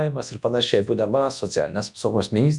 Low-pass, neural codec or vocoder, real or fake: 10.8 kHz; codec, 24 kHz, 0.9 kbps, WavTokenizer, large speech release; fake